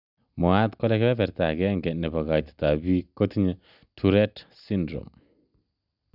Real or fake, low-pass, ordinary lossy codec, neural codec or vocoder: real; 5.4 kHz; none; none